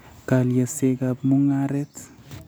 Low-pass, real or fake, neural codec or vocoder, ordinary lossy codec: none; real; none; none